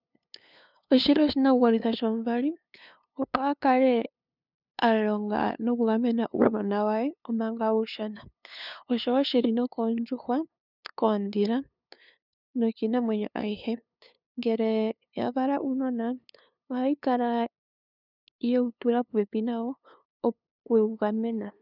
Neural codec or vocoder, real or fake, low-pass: codec, 16 kHz, 2 kbps, FunCodec, trained on LibriTTS, 25 frames a second; fake; 5.4 kHz